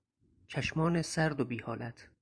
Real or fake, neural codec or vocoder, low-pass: fake; vocoder, 24 kHz, 100 mel bands, Vocos; 9.9 kHz